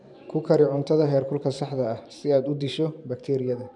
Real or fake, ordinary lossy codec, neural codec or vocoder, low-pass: fake; none; vocoder, 44.1 kHz, 128 mel bands every 512 samples, BigVGAN v2; 10.8 kHz